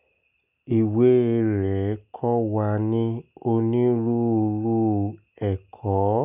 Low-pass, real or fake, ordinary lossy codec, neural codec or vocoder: 3.6 kHz; real; none; none